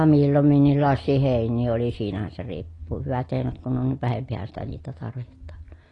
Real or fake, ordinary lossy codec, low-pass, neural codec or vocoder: real; AAC, 32 kbps; 10.8 kHz; none